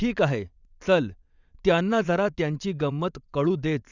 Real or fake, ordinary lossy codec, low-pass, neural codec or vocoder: fake; none; 7.2 kHz; vocoder, 22.05 kHz, 80 mel bands, WaveNeXt